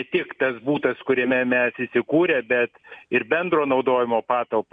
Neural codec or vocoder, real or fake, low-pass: none; real; 9.9 kHz